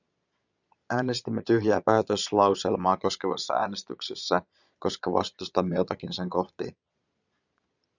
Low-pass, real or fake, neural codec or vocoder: 7.2 kHz; fake; vocoder, 22.05 kHz, 80 mel bands, Vocos